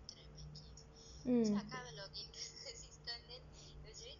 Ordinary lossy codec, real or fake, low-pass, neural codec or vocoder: none; real; 7.2 kHz; none